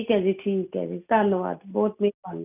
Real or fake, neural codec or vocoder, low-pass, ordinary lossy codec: real; none; 3.6 kHz; none